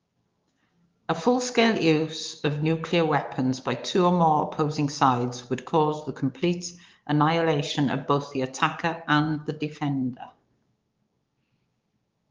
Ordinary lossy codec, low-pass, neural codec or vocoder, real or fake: Opus, 32 kbps; 7.2 kHz; codec, 16 kHz, 6 kbps, DAC; fake